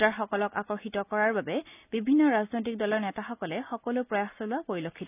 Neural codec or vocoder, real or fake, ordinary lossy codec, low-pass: none; real; none; 3.6 kHz